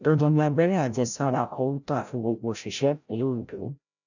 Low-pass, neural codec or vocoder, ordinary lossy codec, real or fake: 7.2 kHz; codec, 16 kHz, 0.5 kbps, FreqCodec, larger model; none; fake